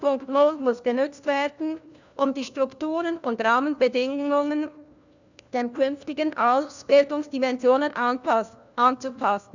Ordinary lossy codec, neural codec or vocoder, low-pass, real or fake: none; codec, 16 kHz, 1 kbps, FunCodec, trained on LibriTTS, 50 frames a second; 7.2 kHz; fake